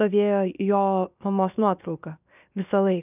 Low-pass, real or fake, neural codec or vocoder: 3.6 kHz; fake; codec, 16 kHz, 0.7 kbps, FocalCodec